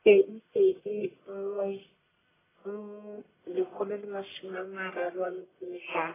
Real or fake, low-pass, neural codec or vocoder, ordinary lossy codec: fake; 3.6 kHz; codec, 44.1 kHz, 1.7 kbps, Pupu-Codec; AAC, 16 kbps